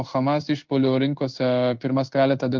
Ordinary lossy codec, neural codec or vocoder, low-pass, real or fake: Opus, 32 kbps; codec, 16 kHz in and 24 kHz out, 1 kbps, XY-Tokenizer; 7.2 kHz; fake